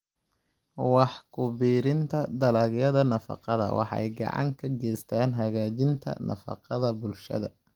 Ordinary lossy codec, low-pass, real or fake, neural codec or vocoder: Opus, 24 kbps; 19.8 kHz; real; none